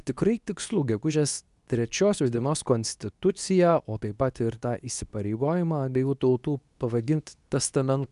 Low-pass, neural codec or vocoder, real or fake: 10.8 kHz; codec, 24 kHz, 0.9 kbps, WavTokenizer, medium speech release version 2; fake